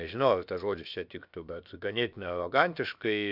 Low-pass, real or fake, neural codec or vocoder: 5.4 kHz; fake; codec, 16 kHz, about 1 kbps, DyCAST, with the encoder's durations